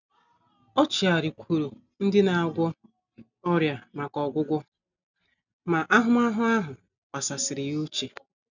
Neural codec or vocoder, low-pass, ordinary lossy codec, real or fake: none; 7.2 kHz; none; real